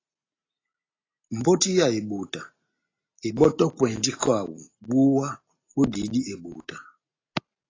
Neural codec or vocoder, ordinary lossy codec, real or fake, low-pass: none; AAC, 32 kbps; real; 7.2 kHz